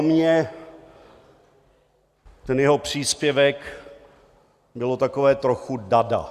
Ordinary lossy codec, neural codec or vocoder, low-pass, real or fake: Opus, 64 kbps; none; 14.4 kHz; real